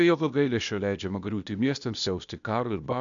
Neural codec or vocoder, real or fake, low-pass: codec, 16 kHz, 0.8 kbps, ZipCodec; fake; 7.2 kHz